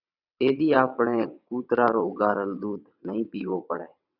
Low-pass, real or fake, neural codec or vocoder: 5.4 kHz; fake; vocoder, 22.05 kHz, 80 mel bands, WaveNeXt